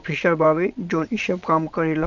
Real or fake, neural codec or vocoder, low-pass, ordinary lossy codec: fake; vocoder, 22.05 kHz, 80 mel bands, WaveNeXt; 7.2 kHz; none